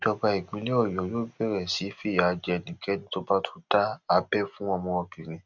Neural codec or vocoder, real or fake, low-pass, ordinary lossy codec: none; real; 7.2 kHz; none